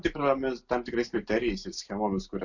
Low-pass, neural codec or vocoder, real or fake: 7.2 kHz; none; real